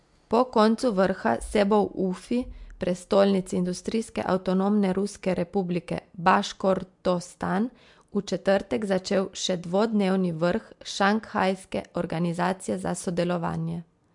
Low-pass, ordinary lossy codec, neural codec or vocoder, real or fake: 10.8 kHz; MP3, 64 kbps; none; real